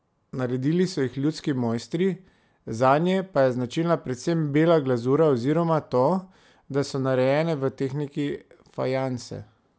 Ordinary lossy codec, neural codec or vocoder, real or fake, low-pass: none; none; real; none